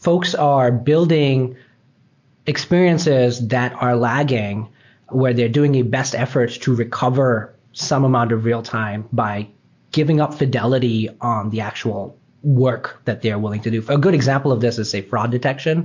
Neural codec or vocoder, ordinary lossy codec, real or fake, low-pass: none; MP3, 48 kbps; real; 7.2 kHz